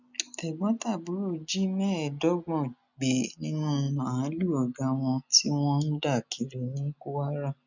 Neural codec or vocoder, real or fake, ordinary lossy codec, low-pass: none; real; none; 7.2 kHz